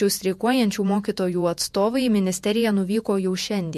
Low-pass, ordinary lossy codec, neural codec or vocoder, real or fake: 14.4 kHz; MP3, 64 kbps; vocoder, 48 kHz, 128 mel bands, Vocos; fake